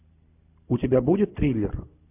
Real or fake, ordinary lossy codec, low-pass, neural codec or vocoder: real; AAC, 32 kbps; 3.6 kHz; none